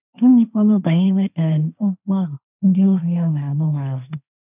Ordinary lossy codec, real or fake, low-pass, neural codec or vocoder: none; fake; 3.6 kHz; codec, 16 kHz, 1.1 kbps, Voila-Tokenizer